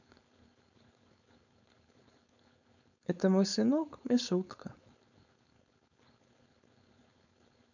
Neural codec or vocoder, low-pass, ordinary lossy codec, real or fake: codec, 16 kHz, 4.8 kbps, FACodec; 7.2 kHz; none; fake